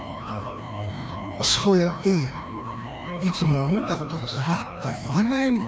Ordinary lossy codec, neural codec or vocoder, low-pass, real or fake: none; codec, 16 kHz, 1 kbps, FreqCodec, larger model; none; fake